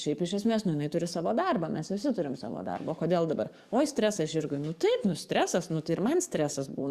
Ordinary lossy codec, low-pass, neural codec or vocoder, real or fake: Opus, 64 kbps; 14.4 kHz; codec, 44.1 kHz, 7.8 kbps, DAC; fake